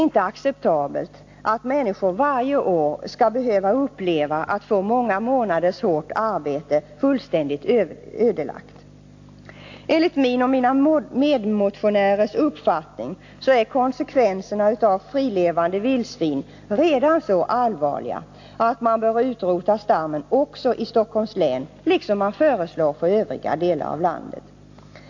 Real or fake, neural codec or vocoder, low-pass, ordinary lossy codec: real; none; 7.2 kHz; AAC, 48 kbps